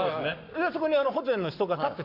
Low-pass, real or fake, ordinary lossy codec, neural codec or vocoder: 5.4 kHz; fake; none; codec, 44.1 kHz, 7.8 kbps, Pupu-Codec